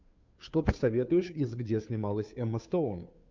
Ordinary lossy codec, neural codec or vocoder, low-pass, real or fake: MP3, 64 kbps; codec, 16 kHz, 2 kbps, FunCodec, trained on Chinese and English, 25 frames a second; 7.2 kHz; fake